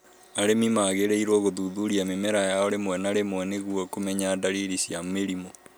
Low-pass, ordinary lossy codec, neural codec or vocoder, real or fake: none; none; none; real